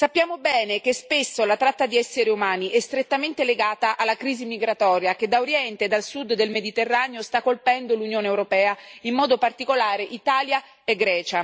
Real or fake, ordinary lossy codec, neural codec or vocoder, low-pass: real; none; none; none